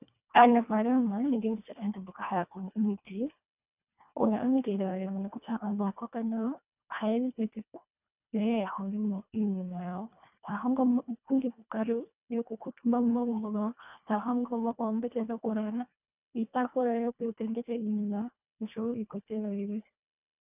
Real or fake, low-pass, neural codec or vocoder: fake; 3.6 kHz; codec, 24 kHz, 1.5 kbps, HILCodec